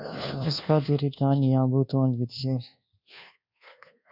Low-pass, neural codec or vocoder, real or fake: 5.4 kHz; codec, 24 kHz, 1.2 kbps, DualCodec; fake